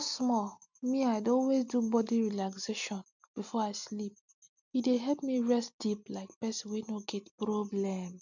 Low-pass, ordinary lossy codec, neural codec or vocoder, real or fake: 7.2 kHz; none; none; real